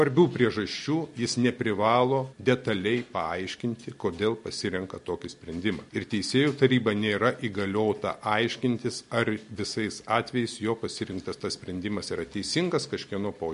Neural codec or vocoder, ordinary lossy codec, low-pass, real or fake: none; MP3, 48 kbps; 10.8 kHz; real